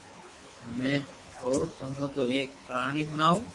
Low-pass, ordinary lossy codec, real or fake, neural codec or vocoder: 10.8 kHz; MP3, 48 kbps; fake; codec, 24 kHz, 3 kbps, HILCodec